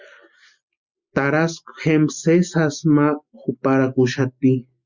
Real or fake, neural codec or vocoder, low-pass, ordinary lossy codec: real; none; 7.2 kHz; Opus, 64 kbps